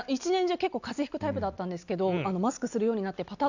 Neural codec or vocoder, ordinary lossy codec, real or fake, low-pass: none; none; real; 7.2 kHz